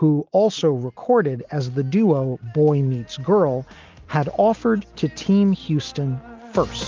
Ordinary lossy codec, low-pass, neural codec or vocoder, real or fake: Opus, 32 kbps; 7.2 kHz; none; real